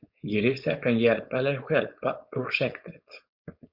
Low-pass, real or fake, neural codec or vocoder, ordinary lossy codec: 5.4 kHz; fake; codec, 16 kHz, 4.8 kbps, FACodec; Opus, 64 kbps